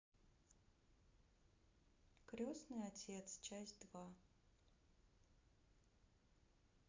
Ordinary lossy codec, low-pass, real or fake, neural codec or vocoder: MP3, 64 kbps; 7.2 kHz; real; none